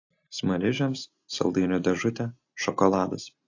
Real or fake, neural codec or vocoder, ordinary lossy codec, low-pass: real; none; AAC, 48 kbps; 7.2 kHz